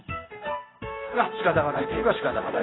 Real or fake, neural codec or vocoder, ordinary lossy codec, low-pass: fake; codec, 16 kHz in and 24 kHz out, 1 kbps, XY-Tokenizer; AAC, 16 kbps; 7.2 kHz